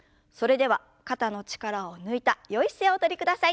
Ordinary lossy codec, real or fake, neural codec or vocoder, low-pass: none; real; none; none